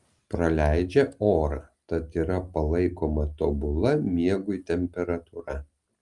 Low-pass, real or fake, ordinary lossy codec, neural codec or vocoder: 10.8 kHz; real; Opus, 32 kbps; none